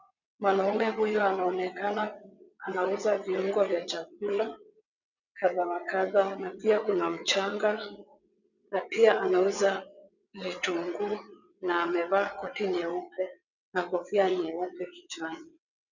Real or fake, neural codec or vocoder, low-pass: fake; vocoder, 22.05 kHz, 80 mel bands, WaveNeXt; 7.2 kHz